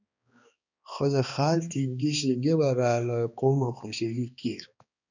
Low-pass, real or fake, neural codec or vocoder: 7.2 kHz; fake; codec, 16 kHz, 2 kbps, X-Codec, HuBERT features, trained on balanced general audio